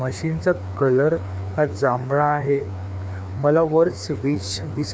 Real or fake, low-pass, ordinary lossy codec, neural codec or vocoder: fake; none; none; codec, 16 kHz, 2 kbps, FreqCodec, larger model